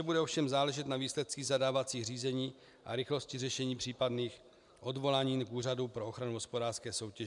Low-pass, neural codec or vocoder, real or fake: 10.8 kHz; none; real